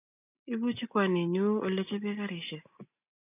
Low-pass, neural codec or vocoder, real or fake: 3.6 kHz; none; real